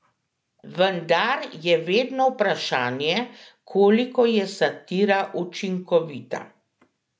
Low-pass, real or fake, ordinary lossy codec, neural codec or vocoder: none; real; none; none